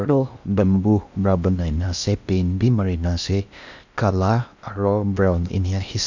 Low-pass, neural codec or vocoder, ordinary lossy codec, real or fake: 7.2 kHz; codec, 16 kHz in and 24 kHz out, 0.6 kbps, FocalCodec, streaming, 4096 codes; none; fake